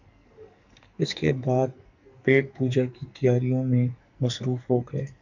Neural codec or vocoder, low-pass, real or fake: codec, 44.1 kHz, 2.6 kbps, SNAC; 7.2 kHz; fake